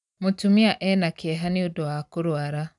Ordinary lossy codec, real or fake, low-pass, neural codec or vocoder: none; real; 10.8 kHz; none